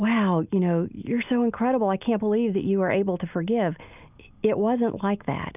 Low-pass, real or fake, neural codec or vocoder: 3.6 kHz; real; none